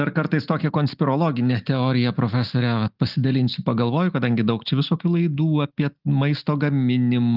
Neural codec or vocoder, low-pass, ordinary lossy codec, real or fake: none; 5.4 kHz; Opus, 24 kbps; real